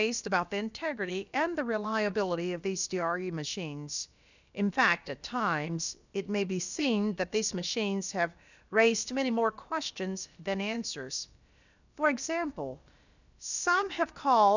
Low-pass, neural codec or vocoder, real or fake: 7.2 kHz; codec, 16 kHz, about 1 kbps, DyCAST, with the encoder's durations; fake